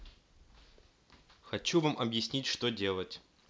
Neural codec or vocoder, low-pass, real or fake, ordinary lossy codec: none; none; real; none